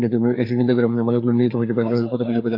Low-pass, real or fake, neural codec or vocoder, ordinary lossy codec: 5.4 kHz; fake; codec, 16 kHz, 4 kbps, FreqCodec, larger model; AAC, 32 kbps